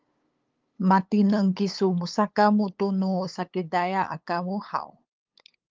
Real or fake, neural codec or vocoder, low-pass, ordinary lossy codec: fake; codec, 16 kHz, 8 kbps, FunCodec, trained on LibriTTS, 25 frames a second; 7.2 kHz; Opus, 32 kbps